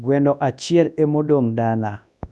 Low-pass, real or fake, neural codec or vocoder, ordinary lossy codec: none; fake; codec, 24 kHz, 0.9 kbps, WavTokenizer, large speech release; none